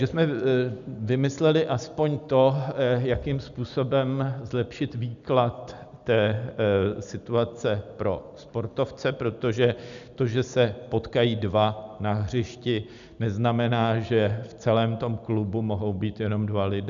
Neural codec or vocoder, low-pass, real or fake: none; 7.2 kHz; real